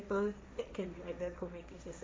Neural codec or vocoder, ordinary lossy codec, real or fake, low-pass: codec, 16 kHz, 1.1 kbps, Voila-Tokenizer; none; fake; none